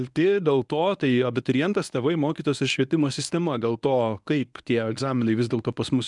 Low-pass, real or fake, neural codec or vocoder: 10.8 kHz; fake; codec, 24 kHz, 0.9 kbps, WavTokenizer, medium speech release version 1